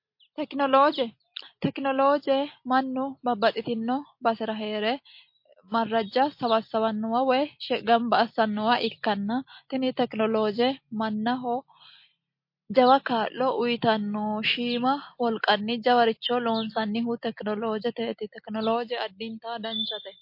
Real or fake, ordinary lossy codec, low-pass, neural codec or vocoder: real; MP3, 32 kbps; 5.4 kHz; none